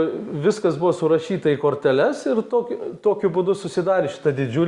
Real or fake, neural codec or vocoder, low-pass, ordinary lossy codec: real; none; 10.8 kHz; Opus, 64 kbps